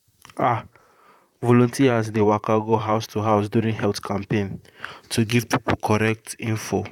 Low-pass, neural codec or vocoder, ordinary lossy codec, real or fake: 19.8 kHz; vocoder, 44.1 kHz, 128 mel bands, Pupu-Vocoder; none; fake